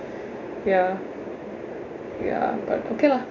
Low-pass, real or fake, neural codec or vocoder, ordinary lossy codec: 7.2 kHz; real; none; none